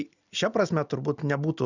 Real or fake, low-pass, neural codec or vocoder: real; 7.2 kHz; none